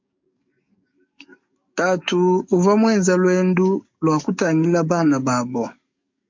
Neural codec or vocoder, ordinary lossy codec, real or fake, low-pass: codec, 44.1 kHz, 7.8 kbps, DAC; MP3, 48 kbps; fake; 7.2 kHz